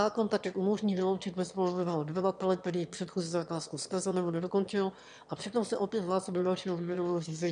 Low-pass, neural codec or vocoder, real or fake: 9.9 kHz; autoencoder, 22.05 kHz, a latent of 192 numbers a frame, VITS, trained on one speaker; fake